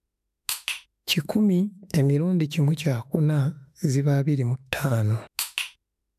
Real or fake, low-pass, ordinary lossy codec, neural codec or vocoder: fake; 14.4 kHz; none; autoencoder, 48 kHz, 32 numbers a frame, DAC-VAE, trained on Japanese speech